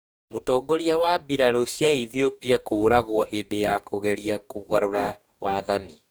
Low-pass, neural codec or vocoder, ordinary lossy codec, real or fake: none; codec, 44.1 kHz, 2.6 kbps, DAC; none; fake